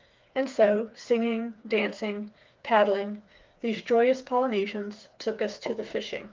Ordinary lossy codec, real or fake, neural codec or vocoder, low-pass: Opus, 24 kbps; fake; codec, 16 kHz, 4 kbps, FreqCodec, smaller model; 7.2 kHz